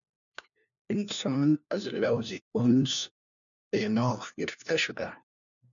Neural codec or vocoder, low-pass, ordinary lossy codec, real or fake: codec, 16 kHz, 1 kbps, FunCodec, trained on LibriTTS, 50 frames a second; 7.2 kHz; MP3, 96 kbps; fake